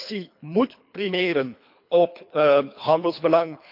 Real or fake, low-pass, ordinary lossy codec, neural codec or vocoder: fake; 5.4 kHz; MP3, 48 kbps; codec, 24 kHz, 3 kbps, HILCodec